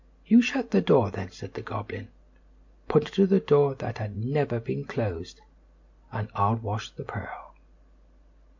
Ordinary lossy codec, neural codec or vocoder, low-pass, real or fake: MP3, 48 kbps; none; 7.2 kHz; real